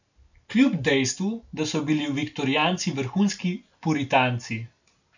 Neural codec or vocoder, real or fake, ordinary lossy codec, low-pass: none; real; none; 7.2 kHz